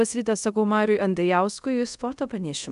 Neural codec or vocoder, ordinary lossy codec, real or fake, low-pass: codec, 24 kHz, 0.5 kbps, DualCodec; MP3, 96 kbps; fake; 10.8 kHz